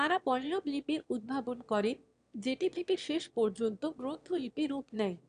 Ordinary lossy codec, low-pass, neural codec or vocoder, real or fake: none; 9.9 kHz; autoencoder, 22.05 kHz, a latent of 192 numbers a frame, VITS, trained on one speaker; fake